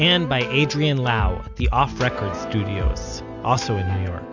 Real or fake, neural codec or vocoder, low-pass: real; none; 7.2 kHz